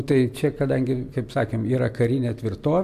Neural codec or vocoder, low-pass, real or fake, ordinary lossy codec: none; 14.4 kHz; real; MP3, 96 kbps